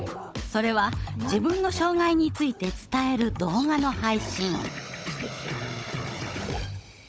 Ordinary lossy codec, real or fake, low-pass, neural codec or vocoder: none; fake; none; codec, 16 kHz, 16 kbps, FunCodec, trained on Chinese and English, 50 frames a second